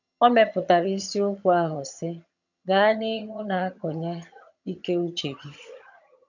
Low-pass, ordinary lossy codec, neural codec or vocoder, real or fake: 7.2 kHz; none; vocoder, 22.05 kHz, 80 mel bands, HiFi-GAN; fake